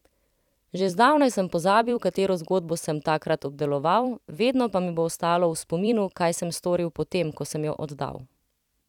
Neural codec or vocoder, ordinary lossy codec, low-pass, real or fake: vocoder, 44.1 kHz, 128 mel bands every 256 samples, BigVGAN v2; none; 19.8 kHz; fake